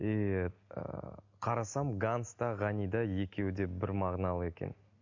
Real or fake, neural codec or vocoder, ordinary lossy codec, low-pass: real; none; MP3, 48 kbps; 7.2 kHz